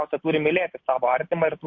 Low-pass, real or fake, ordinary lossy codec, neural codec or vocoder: 7.2 kHz; real; MP3, 48 kbps; none